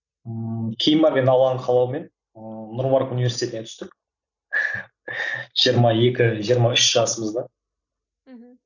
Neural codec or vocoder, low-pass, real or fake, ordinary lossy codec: none; 7.2 kHz; real; none